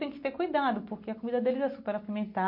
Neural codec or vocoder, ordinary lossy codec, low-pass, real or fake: none; MP3, 32 kbps; 5.4 kHz; real